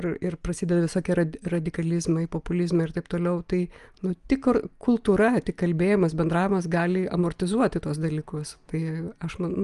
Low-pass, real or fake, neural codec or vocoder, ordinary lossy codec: 10.8 kHz; real; none; Opus, 32 kbps